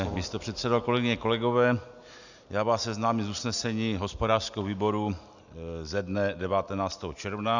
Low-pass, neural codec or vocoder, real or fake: 7.2 kHz; none; real